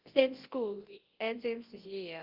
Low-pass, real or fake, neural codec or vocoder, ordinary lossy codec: 5.4 kHz; fake; codec, 24 kHz, 0.9 kbps, WavTokenizer, large speech release; Opus, 16 kbps